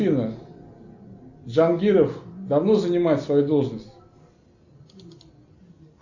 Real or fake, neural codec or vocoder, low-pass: real; none; 7.2 kHz